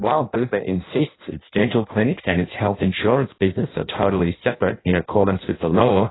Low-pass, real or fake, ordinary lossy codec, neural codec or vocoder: 7.2 kHz; fake; AAC, 16 kbps; codec, 16 kHz in and 24 kHz out, 0.6 kbps, FireRedTTS-2 codec